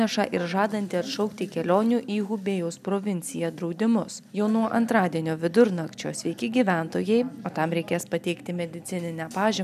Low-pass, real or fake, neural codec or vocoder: 14.4 kHz; real; none